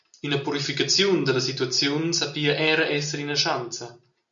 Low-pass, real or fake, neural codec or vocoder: 7.2 kHz; real; none